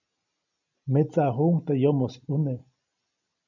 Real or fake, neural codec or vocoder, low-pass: real; none; 7.2 kHz